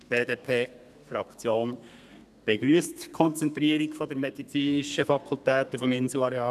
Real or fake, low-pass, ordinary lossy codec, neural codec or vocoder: fake; 14.4 kHz; none; codec, 32 kHz, 1.9 kbps, SNAC